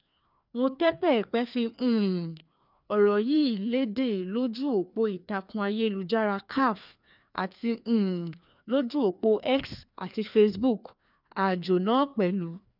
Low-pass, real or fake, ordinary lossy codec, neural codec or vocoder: 5.4 kHz; fake; none; codec, 16 kHz, 2 kbps, FreqCodec, larger model